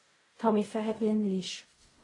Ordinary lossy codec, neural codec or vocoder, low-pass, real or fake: AAC, 32 kbps; codec, 16 kHz in and 24 kHz out, 0.4 kbps, LongCat-Audio-Codec, fine tuned four codebook decoder; 10.8 kHz; fake